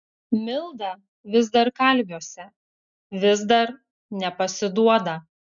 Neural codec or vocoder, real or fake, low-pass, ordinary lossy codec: none; real; 7.2 kHz; MP3, 96 kbps